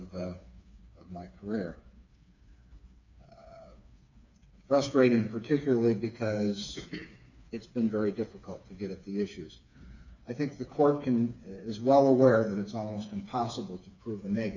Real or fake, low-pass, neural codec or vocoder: fake; 7.2 kHz; codec, 16 kHz, 4 kbps, FreqCodec, smaller model